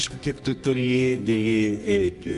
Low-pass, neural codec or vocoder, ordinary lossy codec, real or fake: 10.8 kHz; codec, 24 kHz, 0.9 kbps, WavTokenizer, medium music audio release; Opus, 64 kbps; fake